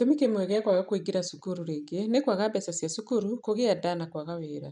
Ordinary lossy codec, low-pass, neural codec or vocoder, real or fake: none; 9.9 kHz; none; real